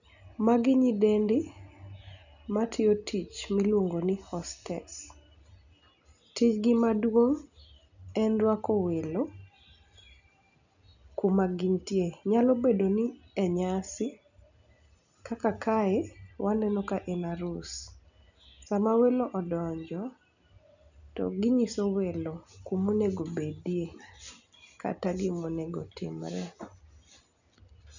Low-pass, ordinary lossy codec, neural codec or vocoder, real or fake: 7.2 kHz; none; none; real